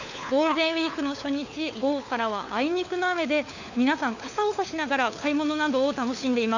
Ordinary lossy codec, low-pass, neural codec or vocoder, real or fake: none; 7.2 kHz; codec, 16 kHz, 4 kbps, FunCodec, trained on LibriTTS, 50 frames a second; fake